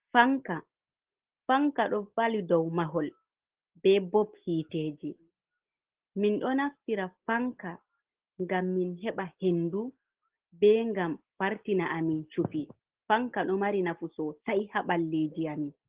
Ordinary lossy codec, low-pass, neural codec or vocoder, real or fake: Opus, 16 kbps; 3.6 kHz; none; real